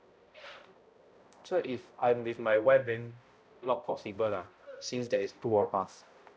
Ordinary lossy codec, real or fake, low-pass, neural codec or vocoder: none; fake; none; codec, 16 kHz, 0.5 kbps, X-Codec, HuBERT features, trained on balanced general audio